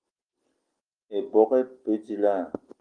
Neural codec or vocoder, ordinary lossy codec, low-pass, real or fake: none; Opus, 24 kbps; 9.9 kHz; real